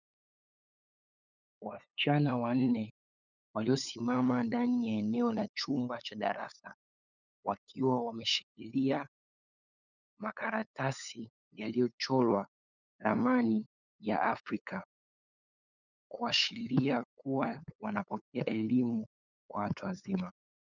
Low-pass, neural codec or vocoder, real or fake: 7.2 kHz; codec, 16 kHz, 8 kbps, FunCodec, trained on LibriTTS, 25 frames a second; fake